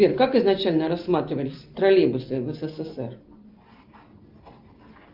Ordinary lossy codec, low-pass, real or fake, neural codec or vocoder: Opus, 24 kbps; 5.4 kHz; real; none